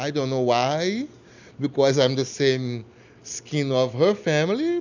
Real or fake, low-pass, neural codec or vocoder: real; 7.2 kHz; none